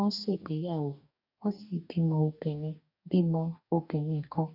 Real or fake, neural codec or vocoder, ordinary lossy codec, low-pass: fake; codec, 44.1 kHz, 2.6 kbps, DAC; none; 5.4 kHz